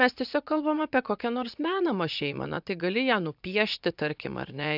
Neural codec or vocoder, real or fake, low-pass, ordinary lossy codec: vocoder, 44.1 kHz, 80 mel bands, Vocos; fake; 5.4 kHz; AAC, 48 kbps